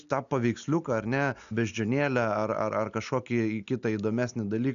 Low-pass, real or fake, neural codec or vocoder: 7.2 kHz; real; none